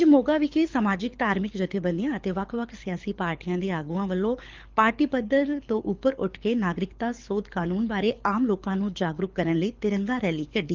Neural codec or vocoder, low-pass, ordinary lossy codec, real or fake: codec, 24 kHz, 6 kbps, HILCodec; 7.2 kHz; Opus, 32 kbps; fake